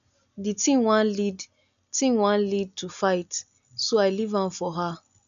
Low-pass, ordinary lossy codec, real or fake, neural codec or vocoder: 7.2 kHz; none; real; none